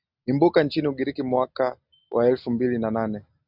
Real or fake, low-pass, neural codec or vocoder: real; 5.4 kHz; none